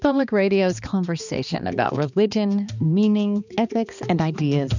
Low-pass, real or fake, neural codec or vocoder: 7.2 kHz; fake; codec, 16 kHz, 2 kbps, X-Codec, HuBERT features, trained on balanced general audio